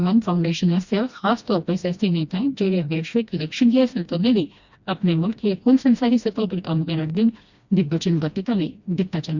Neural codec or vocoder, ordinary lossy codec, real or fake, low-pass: codec, 16 kHz, 1 kbps, FreqCodec, smaller model; Opus, 64 kbps; fake; 7.2 kHz